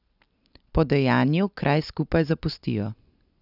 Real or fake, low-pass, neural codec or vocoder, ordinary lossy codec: real; 5.4 kHz; none; none